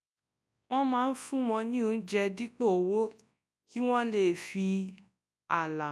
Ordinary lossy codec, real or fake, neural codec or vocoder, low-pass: none; fake; codec, 24 kHz, 0.9 kbps, WavTokenizer, large speech release; none